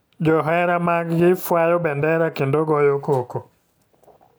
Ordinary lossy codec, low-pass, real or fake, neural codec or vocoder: none; none; real; none